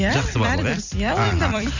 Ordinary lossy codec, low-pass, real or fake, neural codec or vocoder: none; 7.2 kHz; fake; vocoder, 44.1 kHz, 128 mel bands every 256 samples, BigVGAN v2